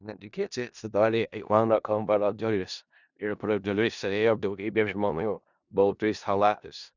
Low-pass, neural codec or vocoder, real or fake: 7.2 kHz; codec, 16 kHz in and 24 kHz out, 0.4 kbps, LongCat-Audio-Codec, four codebook decoder; fake